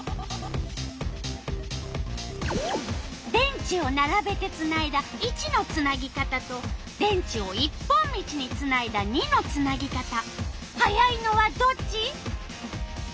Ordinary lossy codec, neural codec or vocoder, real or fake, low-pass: none; none; real; none